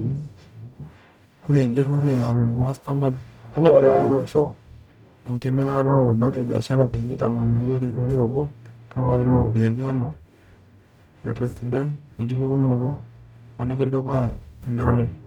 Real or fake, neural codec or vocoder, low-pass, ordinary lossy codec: fake; codec, 44.1 kHz, 0.9 kbps, DAC; 19.8 kHz; none